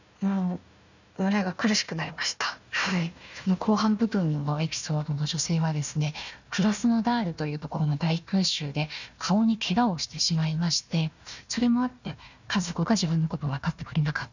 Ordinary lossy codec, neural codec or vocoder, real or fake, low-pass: none; codec, 16 kHz, 1 kbps, FunCodec, trained on Chinese and English, 50 frames a second; fake; 7.2 kHz